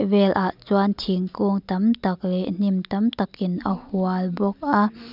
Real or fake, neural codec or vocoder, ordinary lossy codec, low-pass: real; none; none; 5.4 kHz